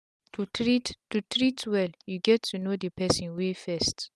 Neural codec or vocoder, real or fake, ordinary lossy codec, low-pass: none; real; none; none